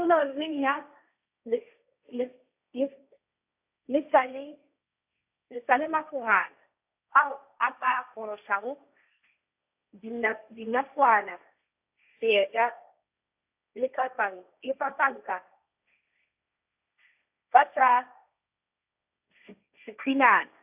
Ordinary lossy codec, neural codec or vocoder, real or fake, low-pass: AAC, 32 kbps; codec, 16 kHz, 1.1 kbps, Voila-Tokenizer; fake; 3.6 kHz